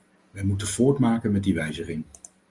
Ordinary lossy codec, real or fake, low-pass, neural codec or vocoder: Opus, 32 kbps; real; 10.8 kHz; none